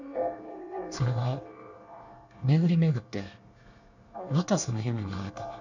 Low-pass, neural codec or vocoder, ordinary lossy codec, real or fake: 7.2 kHz; codec, 24 kHz, 1 kbps, SNAC; none; fake